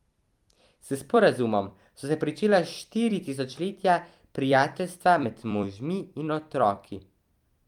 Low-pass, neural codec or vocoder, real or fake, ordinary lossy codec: 19.8 kHz; vocoder, 44.1 kHz, 128 mel bands every 256 samples, BigVGAN v2; fake; Opus, 32 kbps